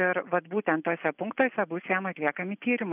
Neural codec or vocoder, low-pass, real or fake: none; 3.6 kHz; real